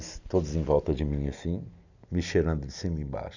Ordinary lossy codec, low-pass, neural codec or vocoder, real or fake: none; 7.2 kHz; none; real